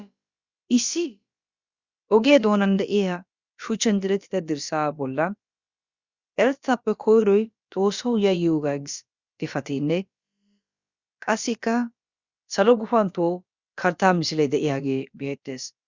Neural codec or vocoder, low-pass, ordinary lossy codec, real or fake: codec, 16 kHz, about 1 kbps, DyCAST, with the encoder's durations; 7.2 kHz; Opus, 64 kbps; fake